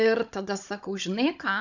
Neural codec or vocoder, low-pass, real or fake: codec, 16 kHz, 16 kbps, FunCodec, trained on Chinese and English, 50 frames a second; 7.2 kHz; fake